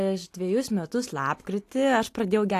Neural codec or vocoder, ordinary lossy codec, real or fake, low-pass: none; AAC, 64 kbps; real; 14.4 kHz